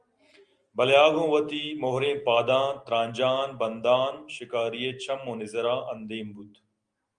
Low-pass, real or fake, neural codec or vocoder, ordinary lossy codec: 9.9 kHz; real; none; Opus, 32 kbps